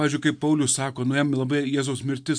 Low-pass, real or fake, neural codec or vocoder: 9.9 kHz; real; none